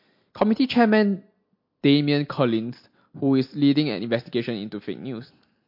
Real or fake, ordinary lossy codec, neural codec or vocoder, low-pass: real; MP3, 32 kbps; none; 5.4 kHz